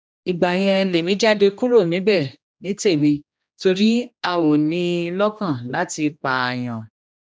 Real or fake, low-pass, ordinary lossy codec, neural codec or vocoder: fake; none; none; codec, 16 kHz, 1 kbps, X-Codec, HuBERT features, trained on general audio